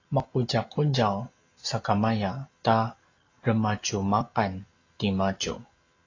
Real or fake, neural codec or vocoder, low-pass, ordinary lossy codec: real; none; 7.2 kHz; AAC, 32 kbps